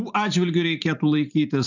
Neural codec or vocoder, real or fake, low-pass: vocoder, 44.1 kHz, 128 mel bands every 512 samples, BigVGAN v2; fake; 7.2 kHz